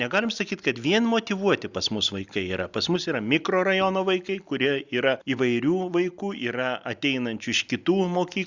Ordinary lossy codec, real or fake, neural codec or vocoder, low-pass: Opus, 64 kbps; real; none; 7.2 kHz